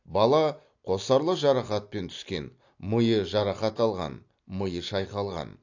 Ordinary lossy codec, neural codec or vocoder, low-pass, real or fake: AAC, 48 kbps; none; 7.2 kHz; real